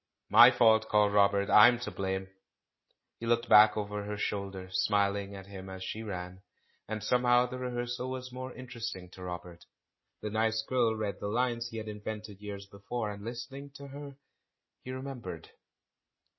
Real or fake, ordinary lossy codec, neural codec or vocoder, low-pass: real; MP3, 24 kbps; none; 7.2 kHz